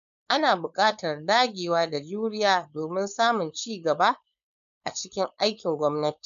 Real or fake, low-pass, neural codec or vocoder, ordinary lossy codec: fake; 7.2 kHz; codec, 16 kHz, 4.8 kbps, FACodec; none